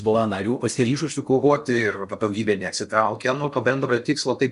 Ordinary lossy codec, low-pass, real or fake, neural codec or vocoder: MP3, 96 kbps; 10.8 kHz; fake; codec, 16 kHz in and 24 kHz out, 0.6 kbps, FocalCodec, streaming, 4096 codes